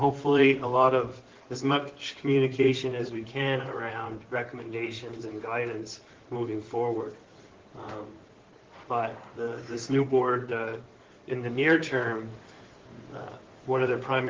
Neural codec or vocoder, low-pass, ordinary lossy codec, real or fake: codec, 16 kHz in and 24 kHz out, 2.2 kbps, FireRedTTS-2 codec; 7.2 kHz; Opus, 16 kbps; fake